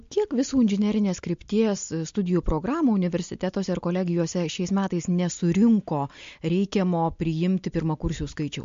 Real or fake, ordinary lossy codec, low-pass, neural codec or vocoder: real; MP3, 48 kbps; 7.2 kHz; none